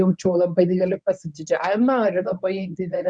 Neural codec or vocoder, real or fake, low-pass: codec, 24 kHz, 0.9 kbps, WavTokenizer, medium speech release version 1; fake; 9.9 kHz